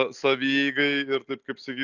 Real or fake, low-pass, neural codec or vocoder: real; 7.2 kHz; none